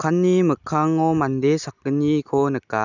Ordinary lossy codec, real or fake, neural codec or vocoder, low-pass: none; real; none; 7.2 kHz